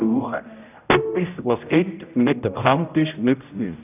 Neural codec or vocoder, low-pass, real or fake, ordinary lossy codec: codec, 16 kHz, 0.5 kbps, X-Codec, HuBERT features, trained on general audio; 3.6 kHz; fake; none